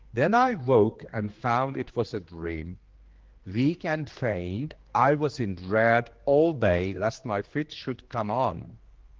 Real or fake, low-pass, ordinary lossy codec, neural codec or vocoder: fake; 7.2 kHz; Opus, 16 kbps; codec, 16 kHz, 2 kbps, X-Codec, HuBERT features, trained on general audio